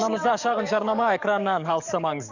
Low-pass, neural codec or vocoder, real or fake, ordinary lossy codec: 7.2 kHz; codec, 44.1 kHz, 7.8 kbps, DAC; fake; none